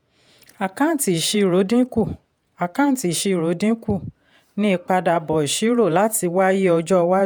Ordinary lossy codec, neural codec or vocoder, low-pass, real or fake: none; vocoder, 48 kHz, 128 mel bands, Vocos; none; fake